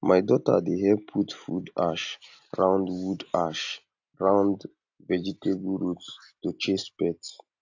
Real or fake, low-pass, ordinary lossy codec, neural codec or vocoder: real; 7.2 kHz; AAC, 48 kbps; none